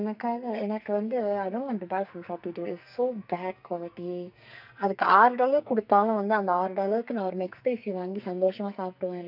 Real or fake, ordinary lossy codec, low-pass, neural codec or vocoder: fake; none; 5.4 kHz; codec, 44.1 kHz, 2.6 kbps, SNAC